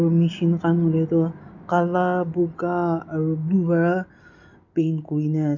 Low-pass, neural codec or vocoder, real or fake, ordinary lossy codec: 7.2 kHz; none; real; none